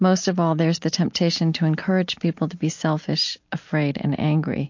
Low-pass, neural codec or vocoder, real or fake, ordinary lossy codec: 7.2 kHz; none; real; MP3, 48 kbps